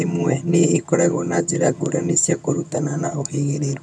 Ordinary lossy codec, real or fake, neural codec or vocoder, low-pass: none; fake; vocoder, 22.05 kHz, 80 mel bands, HiFi-GAN; none